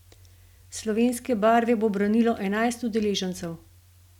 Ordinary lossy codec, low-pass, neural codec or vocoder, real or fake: none; 19.8 kHz; none; real